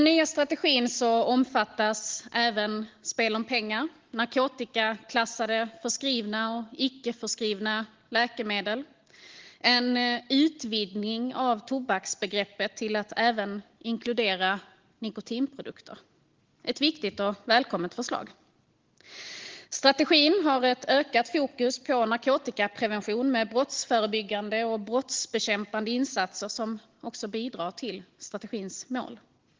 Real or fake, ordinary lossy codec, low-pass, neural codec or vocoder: real; Opus, 16 kbps; 7.2 kHz; none